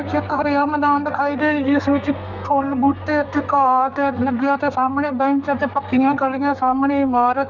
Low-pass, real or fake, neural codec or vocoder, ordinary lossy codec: 7.2 kHz; fake; codec, 32 kHz, 1.9 kbps, SNAC; none